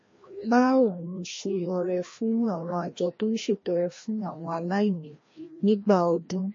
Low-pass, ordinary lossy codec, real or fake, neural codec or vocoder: 7.2 kHz; MP3, 32 kbps; fake; codec, 16 kHz, 1 kbps, FreqCodec, larger model